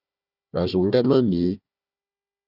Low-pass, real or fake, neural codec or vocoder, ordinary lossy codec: 5.4 kHz; fake; codec, 16 kHz, 1 kbps, FunCodec, trained on Chinese and English, 50 frames a second; Opus, 64 kbps